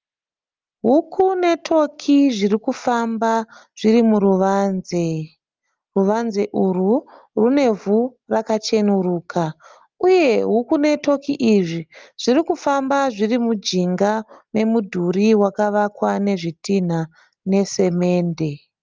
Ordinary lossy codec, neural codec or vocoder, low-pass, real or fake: Opus, 32 kbps; none; 7.2 kHz; real